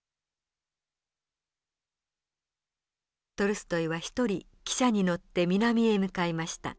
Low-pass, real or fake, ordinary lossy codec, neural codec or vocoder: none; real; none; none